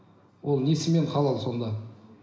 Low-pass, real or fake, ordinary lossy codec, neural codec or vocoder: none; real; none; none